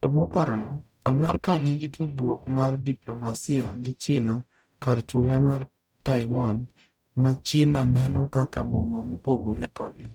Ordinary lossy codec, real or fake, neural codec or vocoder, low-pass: none; fake; codec, 44.1 kHz, 0.9 kbps, DAC; 19.8 kHz